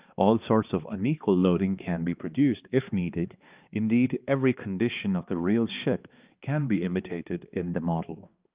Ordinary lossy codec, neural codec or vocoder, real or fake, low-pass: Opus, 24 kbps; codec, 16 kHz, 2 kbps, X-Codec, HuBERT features, trained on balanced general audio; fake; 3.6 kHz